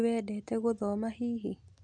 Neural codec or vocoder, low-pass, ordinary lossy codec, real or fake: none; 9.9 kHz; none; real